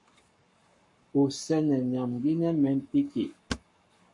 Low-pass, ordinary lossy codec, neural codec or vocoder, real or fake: 10.8 kHz; MP3, 48 kbps; codec, 44.1 kHz, 7.8 kbps, Pupu-Codec; fake